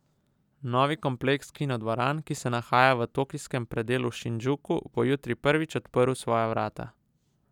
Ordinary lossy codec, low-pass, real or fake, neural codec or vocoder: none; 19.8 kHz; real; none